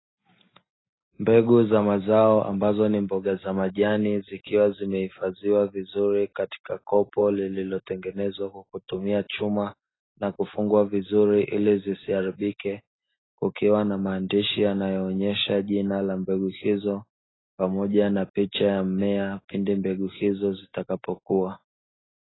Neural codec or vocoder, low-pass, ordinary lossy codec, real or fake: none; 7.2 kHz; AAC, 16 kbps; real